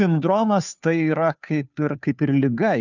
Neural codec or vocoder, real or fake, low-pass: codec, 16 kHz, 2 kbps, FunCodec, trained on Chinese and English, 25 frames a second; fake; 7.2 kHz